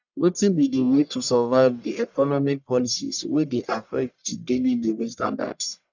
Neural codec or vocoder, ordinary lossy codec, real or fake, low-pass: codec, 44.1 kHz, 1.7 kbps, Pupu-Codec; none; fake; 7.2 kHz